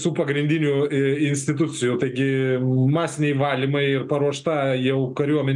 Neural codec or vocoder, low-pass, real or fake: none; 10.8 kHz; real